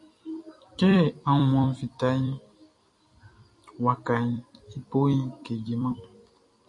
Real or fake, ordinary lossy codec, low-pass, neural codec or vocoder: fake; MP3, 48 kbps; 10.8 kHz; vocoder, 44.1 kHz, 128 mel bands every 256 samples, BigVGAN v2